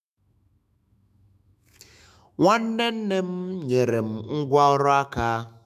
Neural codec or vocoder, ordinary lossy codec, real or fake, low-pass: autoencoder, 48 kHz, 128 numbers a frame, DAC-VAE, trained on Japanese speech; none; fake; 14.4 kHz